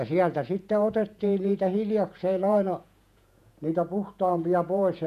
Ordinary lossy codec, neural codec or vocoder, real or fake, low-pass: none; vocoder, 48 kHz, 128 mel bands, Vocos; fake; 14.4 kHz